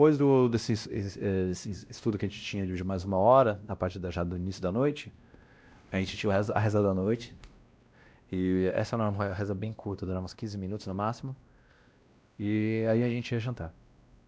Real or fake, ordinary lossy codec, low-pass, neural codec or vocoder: fake; none; none; codec, 16 kHz, 1 kbps, X-Codec, WavLM features, trained on Multilingual LibriSpeech